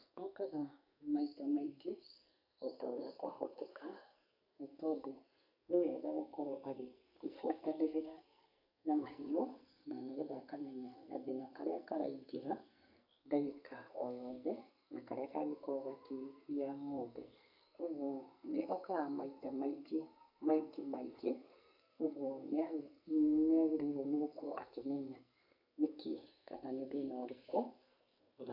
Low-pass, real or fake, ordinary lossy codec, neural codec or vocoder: 5.4 kHz; fake; none; codec, 44.1 kHz, 2.6 kbps, SNAC